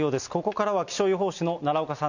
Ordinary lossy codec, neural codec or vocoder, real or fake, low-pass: none; none; real; 7.2 kHz